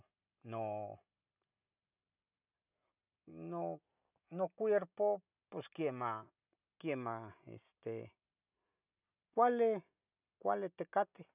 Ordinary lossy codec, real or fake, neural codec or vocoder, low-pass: none; real; none; 3.6 kHz